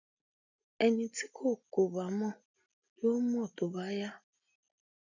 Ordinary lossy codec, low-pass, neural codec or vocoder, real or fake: none; 7.2 kHz; none; real